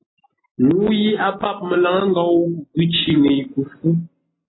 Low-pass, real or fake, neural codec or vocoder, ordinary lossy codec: 7.2 kHz; real; none; AAC, 16 kbps